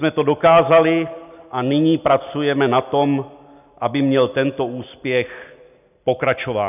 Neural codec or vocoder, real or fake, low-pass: none; real; 3.6 kHz